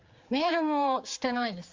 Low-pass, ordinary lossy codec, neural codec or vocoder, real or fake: 7.2 kHz; Opus, 32 kbps; codec, 16 kHz, 4 kbps, X-Codec, HuBERT features, trained on general audio; fake